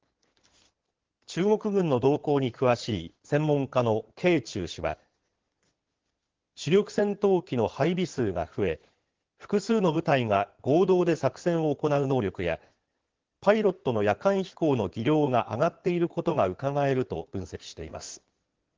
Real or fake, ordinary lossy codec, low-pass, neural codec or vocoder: fake; Opus, 16 kbps; 7.2 kHz; codec, 16 kHz in and 24 kHz out, 2.2 kbps, FireRedTTS-2 codec